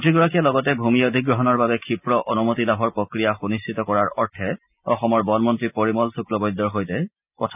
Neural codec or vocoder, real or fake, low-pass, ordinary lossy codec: none; real; 3.6 kHz; none